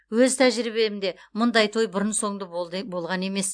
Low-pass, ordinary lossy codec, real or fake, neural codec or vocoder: 9.9 kHz; AAC, 64 kbps; real; none